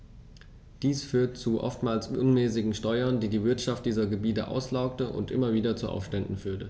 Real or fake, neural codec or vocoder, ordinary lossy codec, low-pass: real; none; none; none